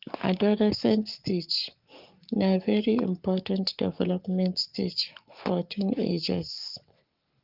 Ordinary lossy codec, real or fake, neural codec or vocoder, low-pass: Opus, 24 kbps; fake; codec, 44.1 kHz, 7.8 kbps, Pupu-Codec; 5.4 kHz